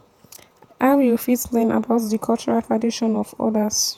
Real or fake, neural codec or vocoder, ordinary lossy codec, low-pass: fake; vocoder, 48 kHz, 128 mel bands, Vocos; none; 19.8 kHz